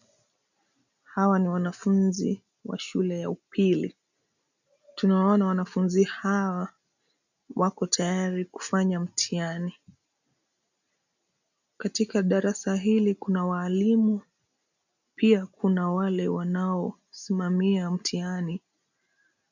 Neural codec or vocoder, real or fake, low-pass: none; real; 7.2 kHz